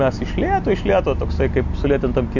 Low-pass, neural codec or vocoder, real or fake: 7.2 kHz; none; real